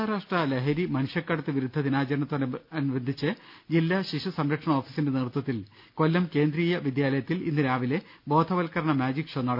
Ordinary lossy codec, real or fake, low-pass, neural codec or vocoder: none; real; 5.4 kHz; none